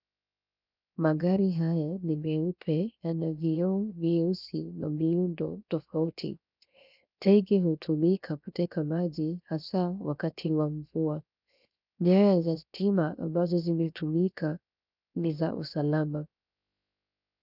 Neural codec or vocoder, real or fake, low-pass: codec, 16 kHz, 0.7 kbps, FocalCodec; fake; 5.4 kHz